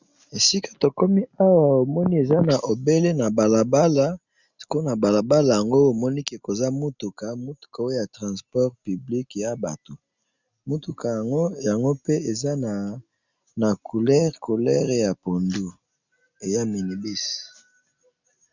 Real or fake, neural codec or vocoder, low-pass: real; none; 7.2 kHz